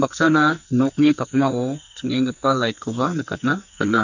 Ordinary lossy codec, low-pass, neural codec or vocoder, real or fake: none; 7.2 kHz; codec, 44.1 kHz, 2.6 kbps, SNAC; fake